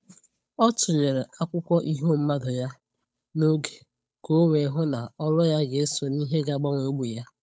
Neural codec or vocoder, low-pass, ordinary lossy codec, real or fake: codec, 16 kHz, 8 kbps, FunCodec, trained on Chinese and English, 25 frames a second; none; none; fake